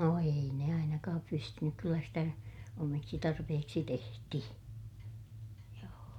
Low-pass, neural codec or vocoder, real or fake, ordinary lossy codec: 19.8 kHz; none; real; none